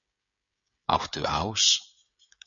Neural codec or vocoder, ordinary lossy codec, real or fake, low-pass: codec, 16 kHz, 16 kbps, FreqCodec, smaller model; MP3, 96 kbps; fake; 7.2 kHz